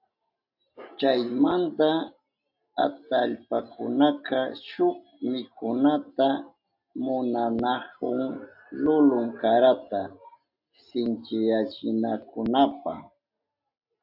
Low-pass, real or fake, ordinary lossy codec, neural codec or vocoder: 5.4 kHz; real; AAC, 48 kbps; none